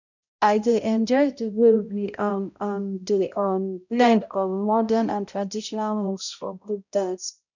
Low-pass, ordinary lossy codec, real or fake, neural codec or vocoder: 7.2 kHz; none; fake; codec, 16 kHz, 0.5 kbps, X-Codec, HuBERT features, trained on balanced general audio